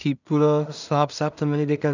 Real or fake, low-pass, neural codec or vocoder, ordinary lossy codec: fake; 7.2 kHz; codec, 16 kHz in and 24 kHz out, 0.4 kbps, LongCat-Audio-Codec, two codebook decoder; none